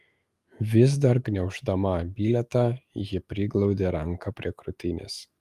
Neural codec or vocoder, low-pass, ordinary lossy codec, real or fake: autoencoder, 48 kHz, 128 numbers a frame, DAC-VAE, trained on Japanese speech; 14.4 kHz; Opus, 32 kbps; fake